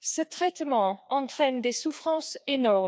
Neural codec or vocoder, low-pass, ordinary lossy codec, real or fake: codec, 16 kHz, 2 kbps, FreqCodec, larger model; none; none; fake